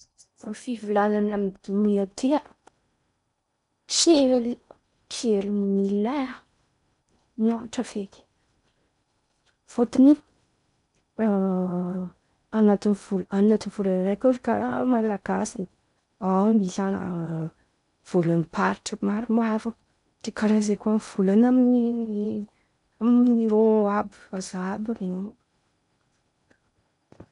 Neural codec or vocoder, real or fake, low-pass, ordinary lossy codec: codec, 16 kHz in and 24 kHz out, 0.6 kbps, FocalCodec, streaming, 4096 codes; fake; 10.8 kHz; none